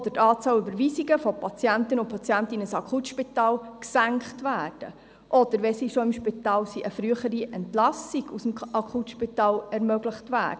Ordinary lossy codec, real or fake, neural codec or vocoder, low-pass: none; real; none; none